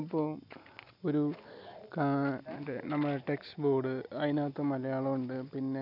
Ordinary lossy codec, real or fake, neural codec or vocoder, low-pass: none; real; none; 5.4 kHz